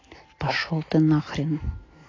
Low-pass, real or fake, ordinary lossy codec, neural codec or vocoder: 7.2 kHz; real; AAC, 32 kbps; none